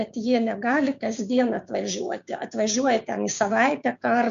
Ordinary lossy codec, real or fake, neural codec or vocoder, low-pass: MP3, 64 kbps; fake; codec, 16 kHz, 6 kbps, DAC; 7.2 kHz